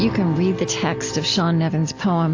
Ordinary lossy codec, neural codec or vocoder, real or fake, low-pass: AAC, 48 kbps; none; real; 7.2 kHz